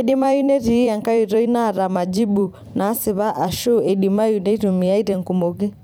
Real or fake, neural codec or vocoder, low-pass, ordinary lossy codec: fake; vocoder, 44.1 kHz, 128 mel bands every 256 samples, BigVGAN v2; none; none